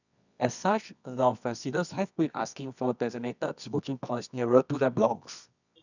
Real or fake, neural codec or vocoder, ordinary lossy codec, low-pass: fake; codec, 24 kHz, 0.9 kbps, WavTokenizer, medium music audio release; none; 7.2 kHz